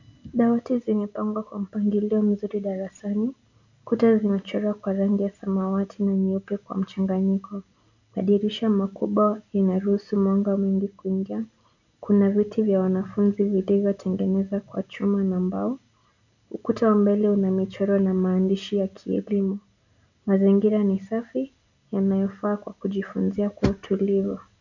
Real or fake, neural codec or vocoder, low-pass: real; none; 7.2 kHz